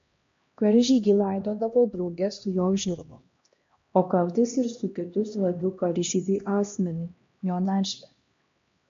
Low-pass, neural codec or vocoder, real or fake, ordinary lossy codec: 7.2 kHz; codec, 16 kHz, 1 kbps, X-Codec, HuBERT features, trained on LibriSpeech; fake; MP3, 48 kbps